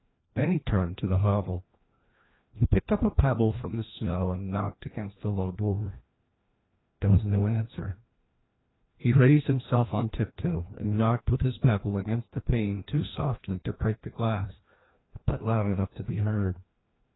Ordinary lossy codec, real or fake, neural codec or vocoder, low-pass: AAC, 16 kbps; fake; codec, 16 kHz, 1 kbps, FreqCodec, larger model; 7.2 kHz